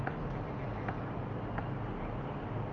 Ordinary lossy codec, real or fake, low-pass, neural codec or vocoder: Opus, 32 kbps; real; 7.2 kHz; none